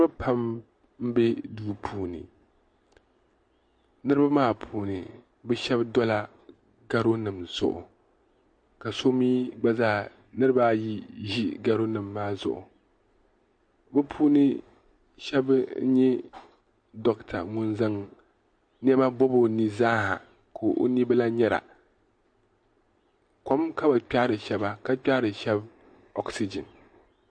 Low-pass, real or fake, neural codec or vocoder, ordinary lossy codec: 9.9 kHz; real; none; MP3, 48 kbps